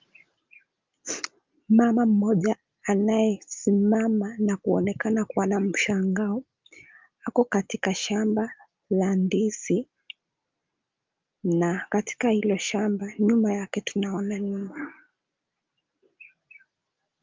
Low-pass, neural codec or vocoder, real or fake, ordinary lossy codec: 7.2 kHz; none; real; Opus, 32 kbps